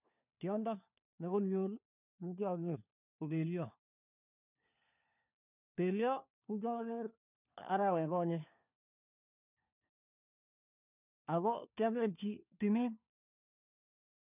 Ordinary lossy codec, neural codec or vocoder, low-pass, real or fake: none; codec, 16 kHz, 4 kbps, FunCodec, trained on LibriTTS, 50 frames a second; 3.6 kHz; fake